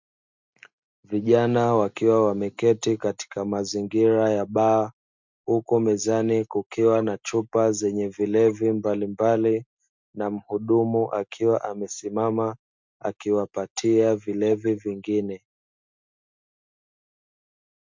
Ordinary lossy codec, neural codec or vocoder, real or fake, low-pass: MP3, 64 kbps; none; real; 7.2 kHz